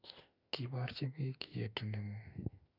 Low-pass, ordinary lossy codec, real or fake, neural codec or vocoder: 5.4 kHz; none; fake; autoencoder, 48 kHz, 32 numbers a frame, DAC-VAE, trained on Japanese speech